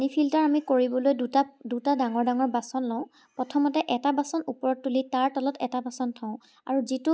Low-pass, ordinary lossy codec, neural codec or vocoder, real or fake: none; none; none; real